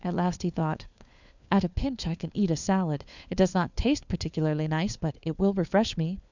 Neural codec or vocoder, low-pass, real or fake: codec, 16 kHz, 4 kbps, FunCodec, trained on LibriTTS, 50 frames a second; 7.2 kHz; fake